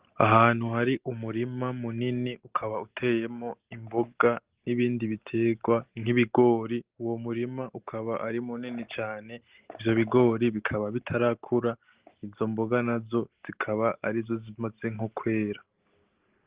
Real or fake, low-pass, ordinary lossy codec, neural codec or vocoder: real; 3.6 kHz; Opus, 16 kbps; none